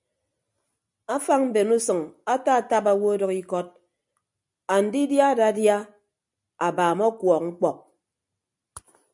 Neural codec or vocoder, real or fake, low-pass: none; real; 10.8 kHz